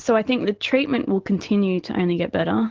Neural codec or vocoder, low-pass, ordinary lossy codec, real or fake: none; 7.2 kHz; Opus, 16 kbps; real